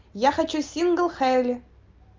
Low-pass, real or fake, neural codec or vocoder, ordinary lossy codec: 7.2 kHz; real; none; Opus, 32 kbps